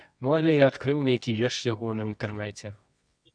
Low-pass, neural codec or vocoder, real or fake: 9.9 kHz; codec, 24 kHz, 0.9 kbps, WavTokenizer, medium music audio release; fake